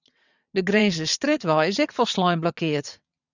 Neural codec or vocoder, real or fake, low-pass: vocoder, 22.05 kHz, 80 mel bands, WaveNeXt; fake; 7.2 kHz